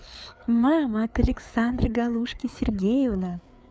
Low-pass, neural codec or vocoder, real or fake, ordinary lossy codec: none; codec, 16 kHz, 4 kbps, FreqCodec, larger model; fake; none